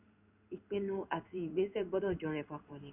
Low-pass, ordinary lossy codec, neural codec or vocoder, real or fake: 3.6 kHz; none; codec, 16 kHz in and 24 kHz out, 1 kbps, XY-Tokenizer; fake